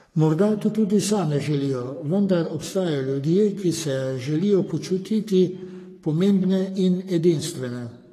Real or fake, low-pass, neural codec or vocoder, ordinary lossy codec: fake; 14.4 kHz; codec, 44.1 kHz, 3.4 kbps, Pupu-Codec; AAC, 48 kbps